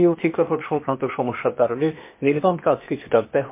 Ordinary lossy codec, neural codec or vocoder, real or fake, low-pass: MP3, 24 kbps; codec, 16 kHz, 0.8 kbps, ZipCodec; fake; 3.6 kHz